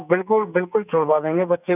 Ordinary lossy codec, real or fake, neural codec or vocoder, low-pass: none; fake; codec, 44.1 kHz, 2.6 kbps, SNAC; 3.6 kHz